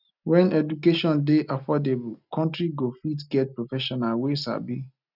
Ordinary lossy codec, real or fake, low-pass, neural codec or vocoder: none; real; 5.4 kHz; none